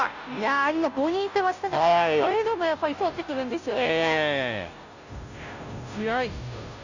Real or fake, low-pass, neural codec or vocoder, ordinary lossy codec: fake; 7.2 kHz; codec, 16 kHz, 0.5 kbps, FunCodec, trained on Chinese and English, 25 frames a second; none